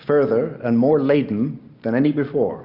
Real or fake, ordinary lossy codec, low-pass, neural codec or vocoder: real; Opus, 64 kbps; 5.4 kHz; none